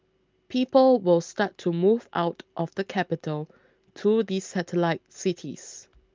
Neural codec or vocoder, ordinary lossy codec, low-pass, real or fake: none; Opus, 24 kbps; 7.2 kHz; real